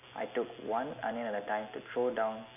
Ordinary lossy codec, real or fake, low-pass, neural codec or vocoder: none; real; 3.6 kHz; none